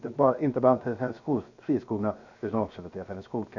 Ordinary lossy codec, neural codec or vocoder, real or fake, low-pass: none; codec, 16 kHz, 0.7 kbps, FocalCodec; fake; 7.2 kHz